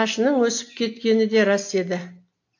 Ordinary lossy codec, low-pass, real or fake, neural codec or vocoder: AAC, 48 kbps; 7.2 kHz; real; none